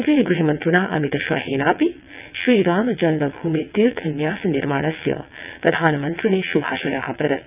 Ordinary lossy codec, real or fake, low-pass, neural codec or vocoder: none; fake; 3.6 kHz; vocoder, 22.05 kHz, 80 mel bands, WaveNeXt